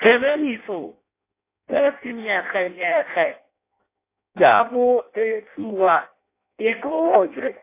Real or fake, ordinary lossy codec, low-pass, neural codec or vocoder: fake; AAC, 24 kbps; 3.6 kHz; codec, 16 kHz in and 24 kHz out, 0.6 kbps, FireRedTTS-2 codec